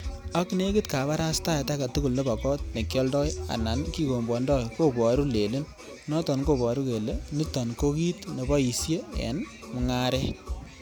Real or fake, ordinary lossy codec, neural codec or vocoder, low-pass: real; none; none; none